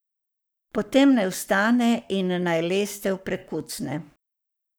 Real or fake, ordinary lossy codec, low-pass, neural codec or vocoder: fake; none; none; codec, 44.1 kHz, 7.8 kbps, Pupu-Codec